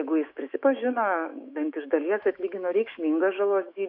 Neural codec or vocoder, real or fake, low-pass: autoencoder, 48 kHz, 128 numbers a frame, DAC-VAE, trained on Japanese speech; fake; 5.4 kHz